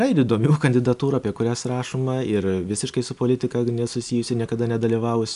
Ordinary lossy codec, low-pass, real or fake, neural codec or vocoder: AAC, 96 kbps; 10.8 kHz; real; none